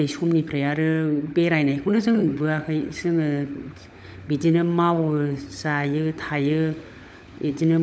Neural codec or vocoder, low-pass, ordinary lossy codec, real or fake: codec, 16 kHz, 16 kbps, FunCodec, trained on LibriTTS, 50 frames a second; none; none; fake